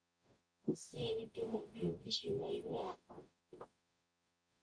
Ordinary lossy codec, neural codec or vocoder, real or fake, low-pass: MP3, 64 kbps; codec, 44.1 kHz, 0.9 kbps, DAC; fake; 9.9 kHz